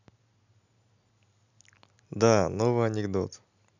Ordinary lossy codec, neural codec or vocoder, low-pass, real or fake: none; none; 7.2 kHz; real